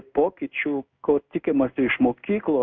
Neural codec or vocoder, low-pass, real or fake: codec, 16 kHz in and 24 kHz out, 1 kbps, XY-Tokenizer; 7.2 kHz; fake